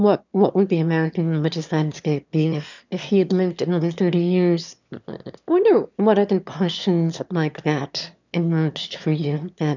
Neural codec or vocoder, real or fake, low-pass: autoencoder, 22.05 kHz, a latent of 192 numbers a frame, VITS, trained on one speaker; fake; 7.2 kHz